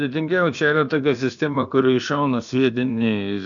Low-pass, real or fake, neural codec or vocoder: 7.2 kHz; fake; codec, 16 kHz, about 1 kbps, DyCAST, with the encoder's durations